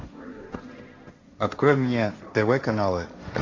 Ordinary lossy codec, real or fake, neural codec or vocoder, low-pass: AAC, 48 kbps; fake; codec, 16 kHz, 1.1 kbps, Voila-Tokenizer; 7.2 kHz